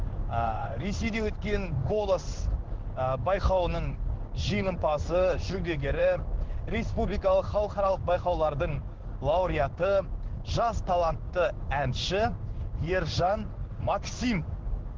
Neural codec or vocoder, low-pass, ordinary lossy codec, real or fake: codec, 16 kHz in and 24 kHz out, 1 kbps, XY-Tokenizer; 7.2 kHz; Opus, 16 kbps; fake